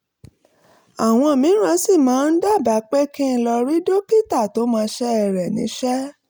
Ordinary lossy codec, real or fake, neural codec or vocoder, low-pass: none; real; none; none